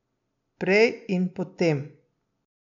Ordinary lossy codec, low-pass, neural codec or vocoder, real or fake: none; 7.2 kHz; none; real